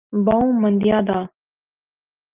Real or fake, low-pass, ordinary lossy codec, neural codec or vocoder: real; 3.6 kHz; Opus, 24 kbps; none